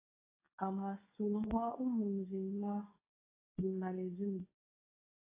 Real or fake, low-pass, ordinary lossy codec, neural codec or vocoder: fake; 3.6 kHz; AAC, 16 kbps; codec, 24 kHz, 0.9 kbps, WavTokenizer, medium speech release version 2